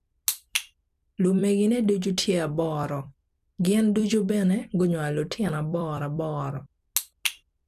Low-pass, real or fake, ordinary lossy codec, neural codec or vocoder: 14.4 kHz; fake; none; vocoder, 44.1 kHz, 128 mel bands every 256 samples, BigVGAN v2